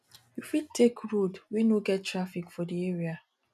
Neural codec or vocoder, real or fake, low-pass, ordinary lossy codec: none; real; 14.4 kHz; none